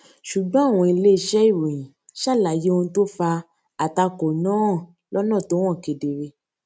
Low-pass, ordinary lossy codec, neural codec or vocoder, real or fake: none; none; none; real